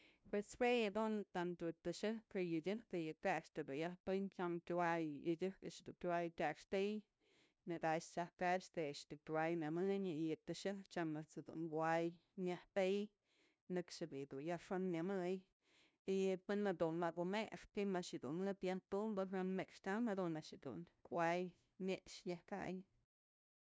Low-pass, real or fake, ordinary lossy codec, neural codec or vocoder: none; fake; none; codec, 16 kHz, 0.5 kbps, FunCodec, trained on LibriTTS, 25 frames a second